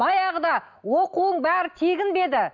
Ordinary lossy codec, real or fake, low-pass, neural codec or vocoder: none; real; 7.2 kHz; none